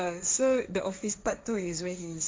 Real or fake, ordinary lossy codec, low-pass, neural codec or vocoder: fake; none; none; codec, 16 kHz, 1.1 kbps, Voila-Tokenizer